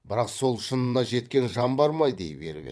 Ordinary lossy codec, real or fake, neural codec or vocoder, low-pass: none; fake; vocoder, 22.05 kHz, 80 mel bands, WaveNeXt; none